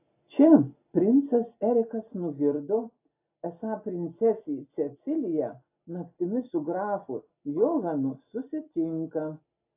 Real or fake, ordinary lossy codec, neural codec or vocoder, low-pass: real; AAC, 24 kbps; none; 3.6 kHz